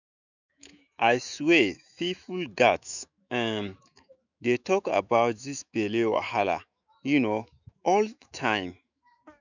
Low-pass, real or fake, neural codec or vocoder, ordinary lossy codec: 7.2 kHz; fake; autoencoder, 48 kHz, 128 numbers a frame, DAC-VAE, trained on Japanese speech; none